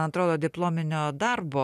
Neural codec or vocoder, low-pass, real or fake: none; 14.4 kHz; real